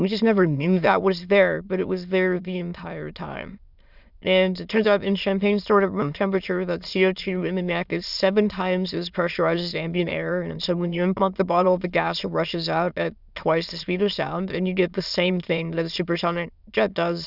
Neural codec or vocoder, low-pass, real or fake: autoencoder, 22.05 kHz, a latent of 192 numbers a frame, VITS, trained on many speakers; 5.4 kHz; fake